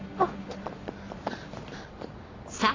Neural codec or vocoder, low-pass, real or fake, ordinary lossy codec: none; 7.2 kHz; real; AAC, 32 kbps